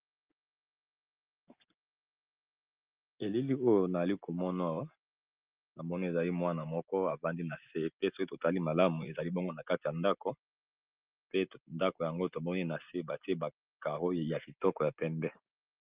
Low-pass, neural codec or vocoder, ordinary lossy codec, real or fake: 3.6 kHz; none; Opus, 24 kbps; real